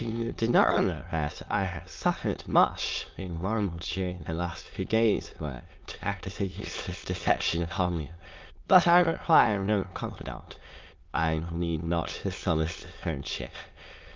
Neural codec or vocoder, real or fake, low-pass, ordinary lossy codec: autoencoder, 22.05 kHz, a latent of 192 numbers a frame, VITS, trained on many speakers; fake; 7.2 kHz; Opus, 24 kbps